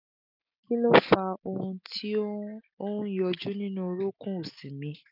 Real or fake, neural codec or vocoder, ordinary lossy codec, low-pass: real; none; none; 5.4 kHz